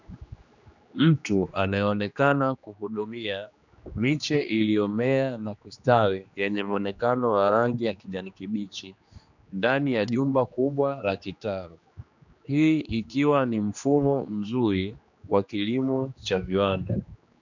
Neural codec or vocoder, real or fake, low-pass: codec, 16 kHz, 2 kbps, X-Codec, HuBERT features, trained on general audio; fake; 7.2 kHz